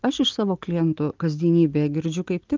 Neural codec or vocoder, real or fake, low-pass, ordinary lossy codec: none; real; 7.2 kHz; Opus, 32 kbps